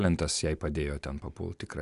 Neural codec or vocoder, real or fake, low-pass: none; real; 10.8 kHz